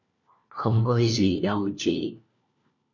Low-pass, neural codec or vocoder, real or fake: 7.2 kHz; codec, 16 kHz, 1 kbps, FunCodec, trained on LibriTTS, 50 frames a second; fake